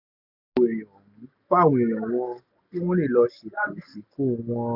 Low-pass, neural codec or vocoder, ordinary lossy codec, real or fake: 5.4 kHz; none; none; real